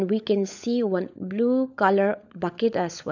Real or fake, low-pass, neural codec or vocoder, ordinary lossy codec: fake; 7.2 kHz; codec, 16 kHz, 16 kbps, FunCodec, trained on LibriTTS, 50 frames a second; none